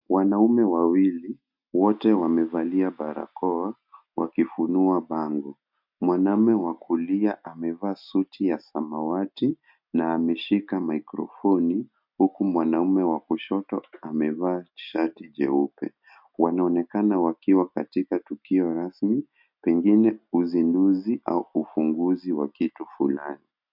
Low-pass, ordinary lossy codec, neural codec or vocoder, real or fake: 5.4 kHz; MP3, 48 kbps; none; real